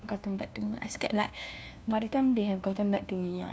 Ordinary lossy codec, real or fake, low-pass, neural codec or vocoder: none; fake; none; codec, 16 kHz, 1 kbps, FunCodec, trained on LibriTTS, 50 frames a second